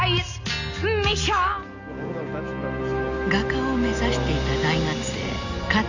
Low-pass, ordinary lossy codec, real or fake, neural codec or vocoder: 7.2 kHz; none; real; none